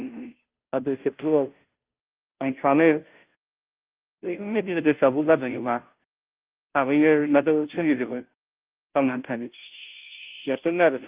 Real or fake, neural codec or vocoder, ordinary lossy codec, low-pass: fake; codec, 16 kHz, 0.5 kbps, FunCodec, trained on Chinese and English, 25 frames a second; Opus, 32 kbps; 3.6 kHz